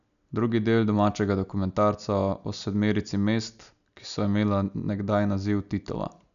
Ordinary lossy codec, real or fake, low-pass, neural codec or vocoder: none; real; 7.2 kHz; none